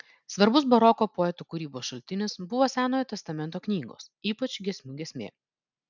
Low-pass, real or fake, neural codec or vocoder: 7.2 kHz; real; none